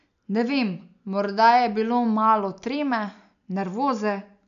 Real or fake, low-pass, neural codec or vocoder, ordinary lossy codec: real; 7.2 kHz; none; none